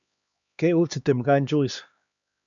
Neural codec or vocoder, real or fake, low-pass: codec, 16 kHz, 2 kbps, X-Codec, HuBERT features, trained on LibriSpeech; fake; 7.2 kHz